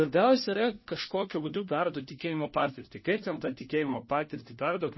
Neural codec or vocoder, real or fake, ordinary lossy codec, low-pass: codec, 16 kHz, 1 kbps, FunCodec, trained on LibriTTS, 50 frames a second; fake; MP3, 24 kbps; 7.2 kHz